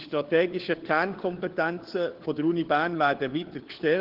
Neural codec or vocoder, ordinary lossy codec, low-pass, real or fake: codec, 16 kHz, 4 kbps, FunCodec, trained on LibriTTS, 50 frames a second; Opus, 16 kbps; 5.4 kHz; fake